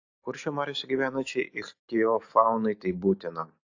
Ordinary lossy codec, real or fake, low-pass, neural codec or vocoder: AAC, 48 kbps; real; 7.2 kHz; none